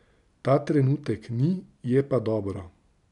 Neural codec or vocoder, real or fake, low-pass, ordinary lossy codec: none; real; 10.8 kHz; none